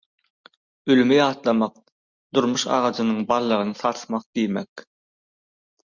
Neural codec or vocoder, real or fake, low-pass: none; real; 7.2 kHz